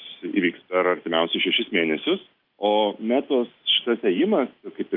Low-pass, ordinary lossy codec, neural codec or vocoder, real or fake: 5.4 kHz; Opus, 24 kbps; none; real